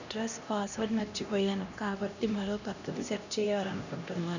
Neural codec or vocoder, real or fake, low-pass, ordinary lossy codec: codec, 16 kHz, 1 kbps, X-Codec, HuBERT features, trained on LibriSpeech; fake; 7.2 kHz; none